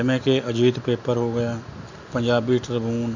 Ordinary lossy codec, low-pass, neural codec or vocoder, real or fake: none; 7.2 kHz; none; real